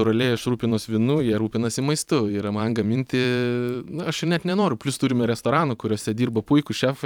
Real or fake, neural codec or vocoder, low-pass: fake; vocoder, 44.1 kHz, 128 mel bands every 512 samples, BigVGAN v2; 19.8 kHz